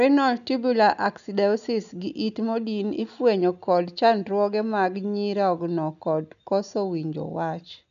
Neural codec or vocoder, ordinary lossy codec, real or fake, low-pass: none; none; real; 7.2 kHz